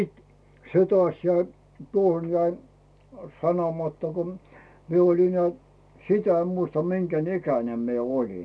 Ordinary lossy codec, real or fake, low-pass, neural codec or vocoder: none; real; none; none